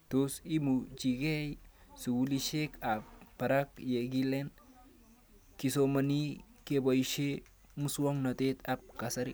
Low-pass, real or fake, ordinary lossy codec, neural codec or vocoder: none; real; none; none